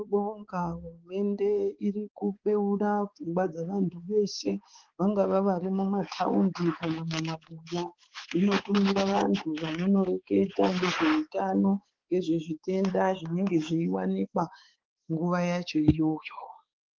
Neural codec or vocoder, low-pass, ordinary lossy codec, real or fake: codec, 16 kHz, 4 kbps, X-Codec, HuBERT features, trained on balanced general audio; 7.2 kHz; Opus, 16 kbps; fake